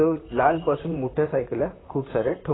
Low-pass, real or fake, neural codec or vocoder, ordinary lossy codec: 7.2 kHz; fake; vocoder, 44.1 kHz, 128 mel bands, Pupu-Vocoder; AAC, 16 kbps